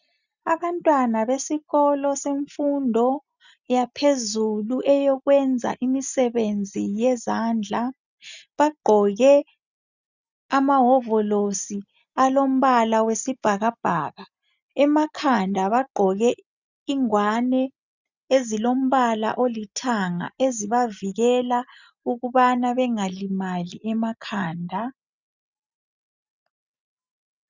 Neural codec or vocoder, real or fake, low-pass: none; real; 7.2 kHz